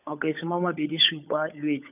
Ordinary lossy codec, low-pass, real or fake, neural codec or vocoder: none; 3.6 kHz; fake; codec, 16 kHz, 16 kbps, FunCodec, trained on LibriTTS, 50 frames a second